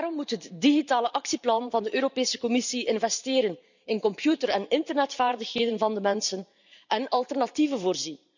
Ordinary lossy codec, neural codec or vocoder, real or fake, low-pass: none; vocoder, 44.1 kHz, 128 mel bands every 512 samples, BigVGAN v2; fake; 7.2 kHz